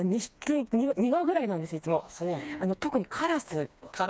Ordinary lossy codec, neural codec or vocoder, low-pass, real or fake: none; codec, 16 kHz, 2 kbps, FreqCodec, smaller model; none; fake